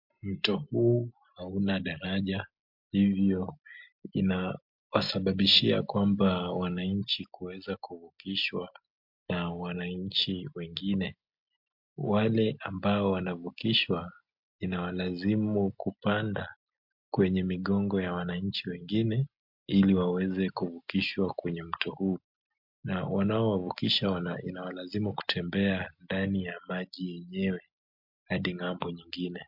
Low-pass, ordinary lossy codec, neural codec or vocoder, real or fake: 5.4 kHz; MP3, 48 kbps; none; real